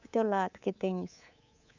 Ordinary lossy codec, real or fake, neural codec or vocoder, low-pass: Opus, 64 kbps; fake; codec, 24 kHz, 3.1 kbps, DualCodec; 7.2 kHz